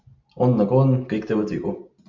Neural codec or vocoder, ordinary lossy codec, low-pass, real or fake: none; MP3, 48 kbps; 7.2 kHz; real